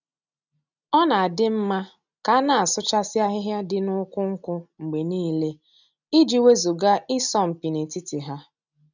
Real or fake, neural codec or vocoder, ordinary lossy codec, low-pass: real; none; none; 7.2 kHz